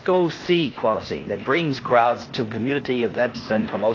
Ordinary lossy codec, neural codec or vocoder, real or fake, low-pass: AAC, 32 kbps; codec, 16 kHz, 0.8 kbps, ZipCodec; fake; 7.2 kHz